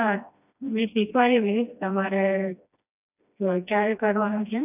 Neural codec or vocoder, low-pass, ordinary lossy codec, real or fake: codec, 16 kHz, 1 kbps, FreqCodec, smaller model; 3.6 kHz; none; fake